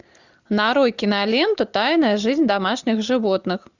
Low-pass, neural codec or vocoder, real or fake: 7.2 kHz; none; real